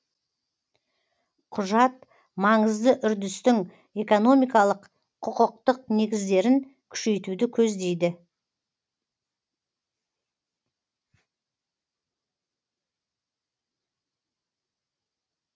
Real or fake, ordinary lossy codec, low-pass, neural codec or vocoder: real; none; none; none